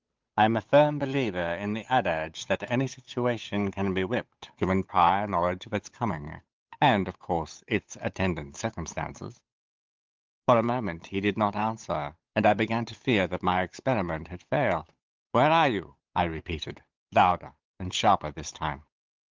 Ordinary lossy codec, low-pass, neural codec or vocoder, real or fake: Opus, 32 kbps; 7.2 kHz; codec, 16 kHz, 8 kbps, FunCodec, trained on Chinese and English, 25 frames a second; fake